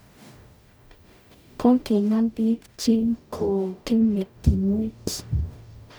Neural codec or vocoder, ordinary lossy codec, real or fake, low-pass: codec, 44.1 kHz, 0.9 kbps, DAC; none; fake; none